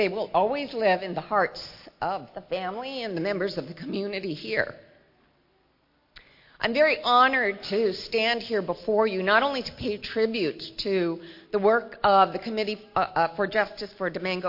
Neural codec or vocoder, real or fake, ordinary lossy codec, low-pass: none; real; MP3, 32 kbps; 5.4 kHz